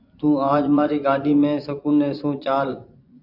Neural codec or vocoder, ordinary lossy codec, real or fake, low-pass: vocoder, 24 kHz, 100 mel bands, Vocos; AAC, 48 kbps; fake; 5.4 kHz